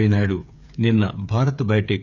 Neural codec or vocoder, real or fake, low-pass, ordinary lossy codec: codec, 16 kHz, 8 kbps, FreqCodec, smaller model; fake; 7.2 kHz; none